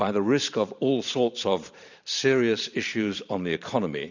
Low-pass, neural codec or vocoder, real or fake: 7.2 kHz; vocoder, 44.1 kHz, 128 mel bands every 512 samples, BigVGAN v2; fake